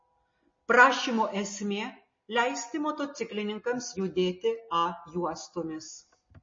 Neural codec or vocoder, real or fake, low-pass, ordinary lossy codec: none; real; 7.2 kHz; MP3, 32 kbps